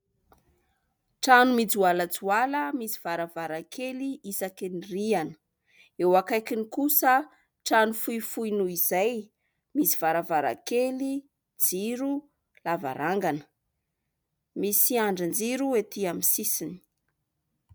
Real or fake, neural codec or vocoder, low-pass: real; none; 19.8 kHz